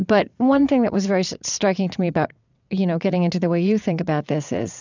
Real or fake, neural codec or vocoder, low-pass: fake; vocoder, 22.05 kHz, 80 mel bands, WaveNeXt; 7.2 kHz